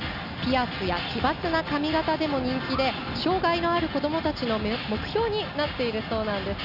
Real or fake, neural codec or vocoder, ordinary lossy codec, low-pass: real; none; none; 5.4 kHz